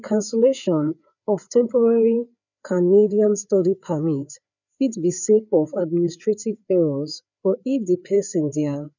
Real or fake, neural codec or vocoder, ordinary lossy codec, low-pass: fake; codec, 16 kHz, 4 kbps, FreqCodec, larger model; none; 7.2 kHz